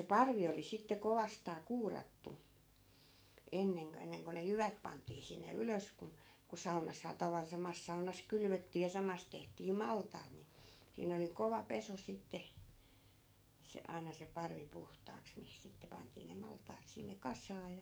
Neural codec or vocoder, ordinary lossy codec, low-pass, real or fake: codec, 44.1 kHz, 7.8 kbps, DAC; none; none; fake